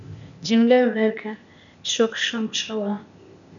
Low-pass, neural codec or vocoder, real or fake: 7.2 kHz; codec, 16 kHz, 0.8 kbps, ZipCodec; fake